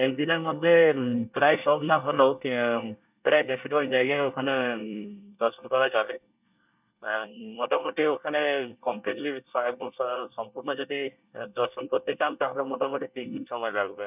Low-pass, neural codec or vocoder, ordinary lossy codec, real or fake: 3.6 kHz; codec, 24 kHz, 1 kbps, SNAC; none; fake